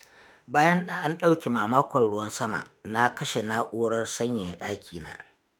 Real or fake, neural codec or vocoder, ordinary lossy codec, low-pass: fake; autoencoder, 48 kHz, 32 numbers a frame, DAC-VAE, trained on Japanese speech; none; none